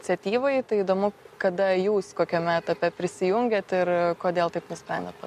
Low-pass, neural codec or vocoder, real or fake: 14.4 kHz; none; real